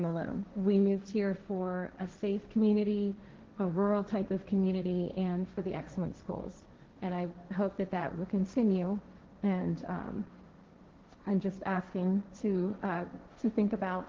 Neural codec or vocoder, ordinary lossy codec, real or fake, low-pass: codec, 16 kHz, 1.1 kbps, Voila-Tokenizer; Opus, 16 kbps; fake; 7.2 kHz